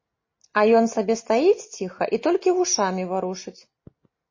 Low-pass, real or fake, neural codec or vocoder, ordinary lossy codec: 7.2 kHz; real; none; MP3, 32 kbps